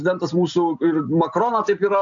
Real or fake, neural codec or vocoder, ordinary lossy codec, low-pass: real; none; AAC, 64 kbps; 7.2 kHz